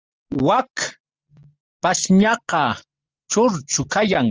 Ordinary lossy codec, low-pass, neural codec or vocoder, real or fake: Opus, 24 kbps; 7.2 kHz; none; real